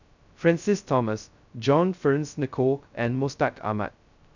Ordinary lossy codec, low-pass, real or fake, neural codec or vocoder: none; 7.2 kHz; fake; codec, 16 kHz, 0.2 kbps, FocalCodec